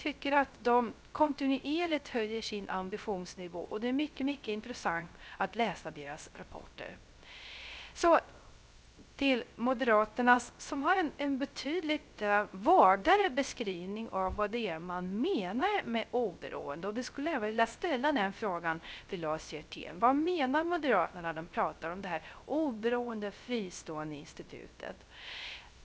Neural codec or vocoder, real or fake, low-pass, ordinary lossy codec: codec, 16 kHz, 0.3 kbps, FocalCodec; fake; none; none